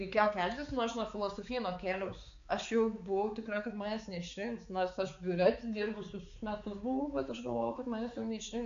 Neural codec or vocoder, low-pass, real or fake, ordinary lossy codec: codec, 16 kHz, 4 kbps, X-Codec, HuBERT features, trained on balanced general audio; 7.2 kHz; fake; MP3, 64 kbps